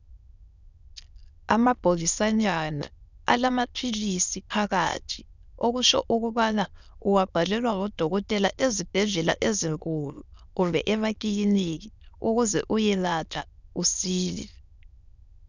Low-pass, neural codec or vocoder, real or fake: 7.2 kHz; autoencoder, 22.05 kHz, a latent of 192 numbers a frame, VITS, trained on many speakers; fake